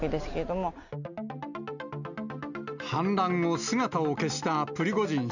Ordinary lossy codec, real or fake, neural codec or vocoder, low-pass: none; real; none; 7.2 kHz